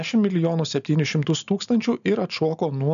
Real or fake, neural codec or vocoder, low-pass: real; none; 7.2 kHz